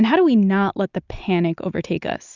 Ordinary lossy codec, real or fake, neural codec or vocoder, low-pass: Opus, 64 kbps; real; none; 7.2 kHz